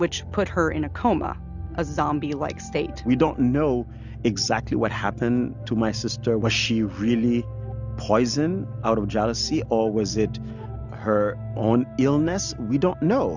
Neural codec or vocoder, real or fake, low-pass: none; real; 7.2 kHz